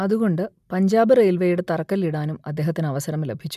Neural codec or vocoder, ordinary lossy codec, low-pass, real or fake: none; none; 14.4 kHz; real